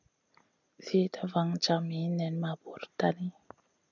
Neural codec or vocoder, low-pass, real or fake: none; 7.2 kHz; real